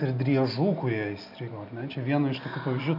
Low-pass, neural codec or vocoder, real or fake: 5.4 kHz; none; real